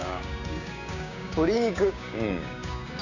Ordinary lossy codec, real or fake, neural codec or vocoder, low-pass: none; fake; codec, 16 kHz, 6 kbps, DAC; 7.2 kHz